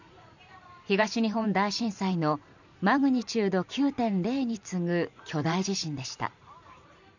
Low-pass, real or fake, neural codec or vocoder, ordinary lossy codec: 7.2 kHz; fake; vocoder, 44.1 kHz, 128 mel bands every 512 samples, BigVGAN v2; none